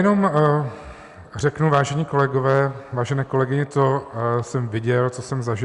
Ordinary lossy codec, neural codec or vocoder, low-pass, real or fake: Opus, 64 kbps; none; 10.8 kHz; real